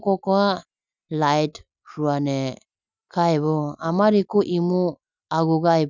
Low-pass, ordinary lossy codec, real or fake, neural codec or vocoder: 7.2 kHz; none; real; none